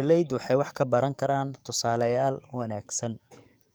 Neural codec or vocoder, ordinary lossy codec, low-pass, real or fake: codec, 44.1 kHz, 7.8 kbps, DAC; none; none; fake